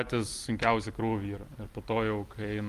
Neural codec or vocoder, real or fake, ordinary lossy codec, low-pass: vocoder, 44.1 kHz, 128 mel bands every 256 samples, BigVGAN v2; fake; Opus, 32 kbps; 14.4 kHz